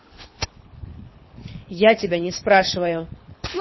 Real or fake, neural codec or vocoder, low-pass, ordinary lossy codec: fake; codec, 24 kHz, 6 kbps, HILCodec; 7.2 kHz; MP3, 24 kbps